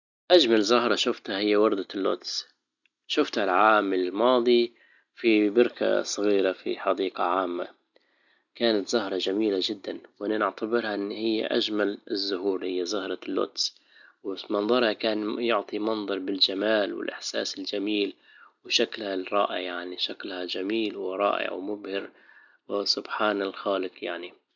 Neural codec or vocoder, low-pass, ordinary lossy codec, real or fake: none; 7.2 kHz; none; real